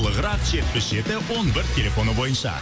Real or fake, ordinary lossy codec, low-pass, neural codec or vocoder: real; none; none; none